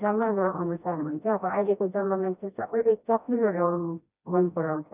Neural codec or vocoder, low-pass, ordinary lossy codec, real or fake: codec, 16 kHz, 1 kbps, FreqCodec, smaller model; 3.6 kHz; none; fake